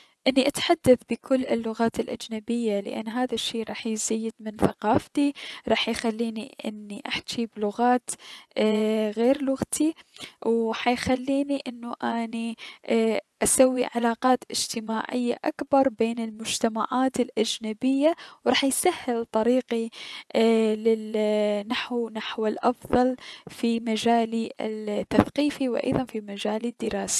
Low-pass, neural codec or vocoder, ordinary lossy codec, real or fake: none; vocoder, 24 kHz, 100 mel bands, Vocos; none; fake